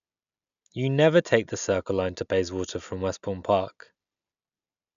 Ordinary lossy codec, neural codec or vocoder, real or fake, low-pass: none; none; real; 7.2 kHz